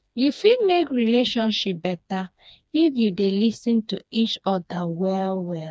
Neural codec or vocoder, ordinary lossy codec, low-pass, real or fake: codec, 16 kHz, 2 kbps, FreqCodec, smaller model; none; none; fake